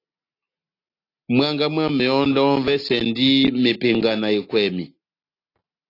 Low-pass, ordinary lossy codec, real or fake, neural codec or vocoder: 5.4 kHz; AAC, 32 kbps; real; none